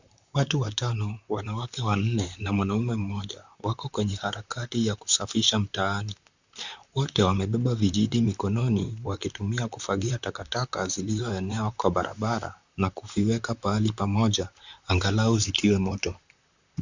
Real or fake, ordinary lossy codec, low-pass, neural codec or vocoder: fake; Opus, 64 kbps; 7.2 kHz; vocoder, 44.1 kHz, 128 mel bands, Pupu-Vocoder